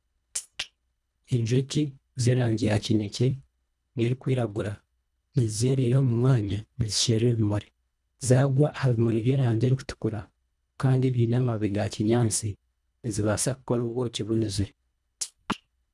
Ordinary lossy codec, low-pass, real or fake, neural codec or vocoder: none; none; fake; codec, 24 kHz, 1.5 kbps, HILCodec